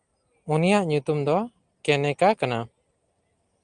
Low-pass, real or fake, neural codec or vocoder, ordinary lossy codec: 9.9 kHz; real; none; Opus, 32 kbps